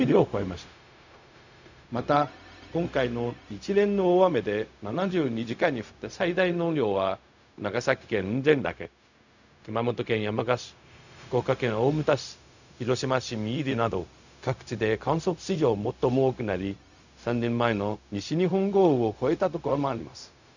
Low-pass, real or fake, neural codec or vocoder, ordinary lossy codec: 7.2 kHz; fake; codec, 16 kHz, 0.4 kbps, LongCat-Audio-Codec; none